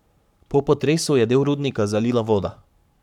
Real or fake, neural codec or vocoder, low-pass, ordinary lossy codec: fake; codec, 44.1 kHz, 7.8 kbps, Pupu-Codec; 19.8 kHz; none